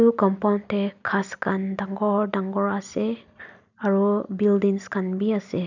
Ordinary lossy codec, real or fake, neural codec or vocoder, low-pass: none; real; none; 7.2 kHz